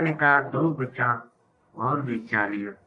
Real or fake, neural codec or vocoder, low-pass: fake; codec, 44.1 kHz, 1.7 kbps, Pupu-Codec; 10.8 kHz